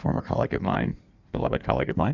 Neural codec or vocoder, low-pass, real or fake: codec, 16 kHz in and 24 kHz out, 1.1 kbps, FireRedTTS-2 codec; 7.2 kHz; fake